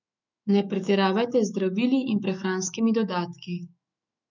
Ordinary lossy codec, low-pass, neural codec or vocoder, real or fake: none; 7.2 kHz; autoencoder, 48 kHz, 128 numbers a frame, DAC-VAE, trained on Japanese speech; fake